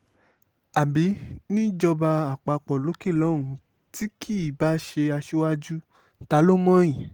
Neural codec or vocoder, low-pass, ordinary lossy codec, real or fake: none; 19.8 kHz; Opus, 24 kbps; real